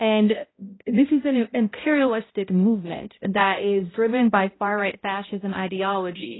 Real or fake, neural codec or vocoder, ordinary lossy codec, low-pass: fake; codec, 16 kHz, 0.5 kbps, X-Codec, HuBERT features, trained on balanced general audio; AAC, 16 kbps; 7.2 kHz